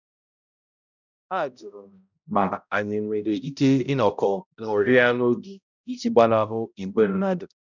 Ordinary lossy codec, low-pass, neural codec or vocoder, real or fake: none; 7.2 kHz; codec, 16 kHz, 0.5 kbps, X-Codec, HuBERT features, trained on balanced general audio; fake